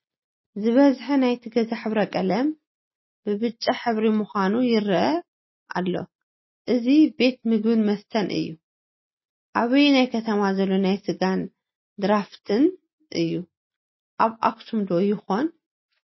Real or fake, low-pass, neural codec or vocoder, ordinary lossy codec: real; 7.2 kHz; none; MP3, 24 kbps